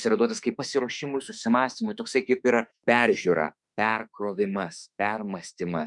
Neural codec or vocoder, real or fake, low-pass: autoencoder, 48 kHz, 32 numbers a frame, DAC-VAE, trained on Japanese speech; fake; 10.8 kHz